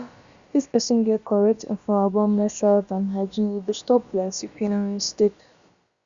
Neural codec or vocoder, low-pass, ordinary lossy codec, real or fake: codec, 16 kHz, about 1 kbps, DyCAST, with the encoder's durations; 7.2 kHz; Opus, 64 kbps; fake